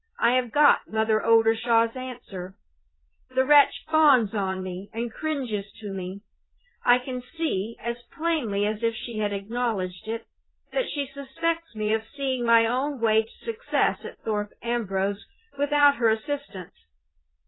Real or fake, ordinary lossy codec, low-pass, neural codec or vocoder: fake; AAC, 16 kbps; 7.2 kHz; vocoder, 22.05 kHz, 80 mel bands, Vocos